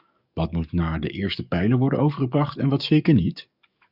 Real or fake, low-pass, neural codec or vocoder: fake; 5.4 kHz; codec, 44.1 kHz, 7.8 kbps, DAC